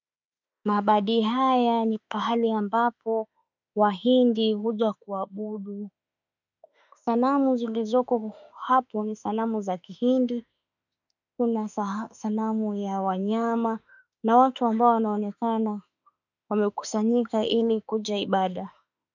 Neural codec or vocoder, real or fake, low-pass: autoencoder, 48 kHz, 32 numbers a frame, DAC-VAE, trained on Japanese speech; fake; 7.2 kHz